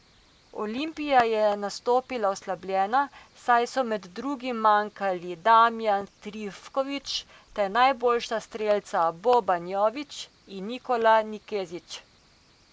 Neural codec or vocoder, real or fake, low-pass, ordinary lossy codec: none; real; none; none